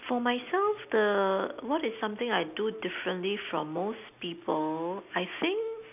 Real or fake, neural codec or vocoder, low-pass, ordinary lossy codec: real; none; 3.6 kHz; none